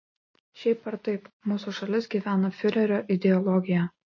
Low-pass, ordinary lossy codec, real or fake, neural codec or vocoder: 7.2 kHz; MP3, 32 kbps; real; none